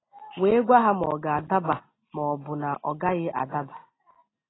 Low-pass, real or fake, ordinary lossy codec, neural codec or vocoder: 7.2 kHz; real; AAC, 16 kbps; none